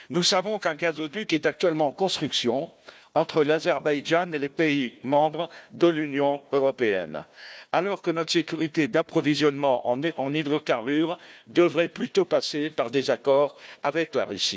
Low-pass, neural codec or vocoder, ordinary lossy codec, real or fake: none; codec, 16 kHz, 1 kbps, FunCodec, trained on Chinese and English, 50 frames a second; none; fake